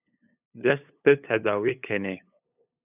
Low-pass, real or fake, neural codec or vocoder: 3.6 kHz; fake; codec, 16 kHz, 8 kbps, FunCodec, trained on LibriTTS, 25 frames a second